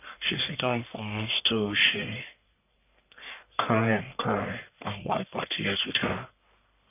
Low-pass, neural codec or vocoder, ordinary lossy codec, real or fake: 3.6 kHz; codec, 44.1 kHz, 1.7 kbps, Pupu-Codec; none; fake